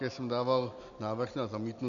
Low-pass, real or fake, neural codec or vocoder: 7.2 kHz; real; none